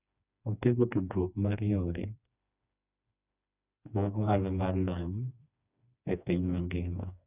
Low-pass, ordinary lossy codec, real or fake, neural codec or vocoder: 3.6 kHz; none; fake; codec, 16 kHz, 2 kbps, FreqCodec, smaller model